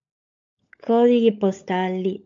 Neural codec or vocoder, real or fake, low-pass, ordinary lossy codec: codec, 16 kHz, 16 kbps, FunCodec, trained on LibriTTS, 50 frames a second; fake; 7.2 kHz; AAC, 64 kbps